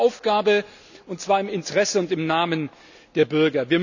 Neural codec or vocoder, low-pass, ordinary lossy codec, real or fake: none; 7.2 kHz; none; real